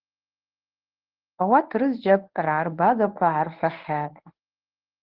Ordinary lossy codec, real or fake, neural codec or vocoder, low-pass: Opus, 16 kbps; fake; codec, 24 kHz, 0.9 kbps, WavTokenizer, medium speech release version 1; 5.4 kHz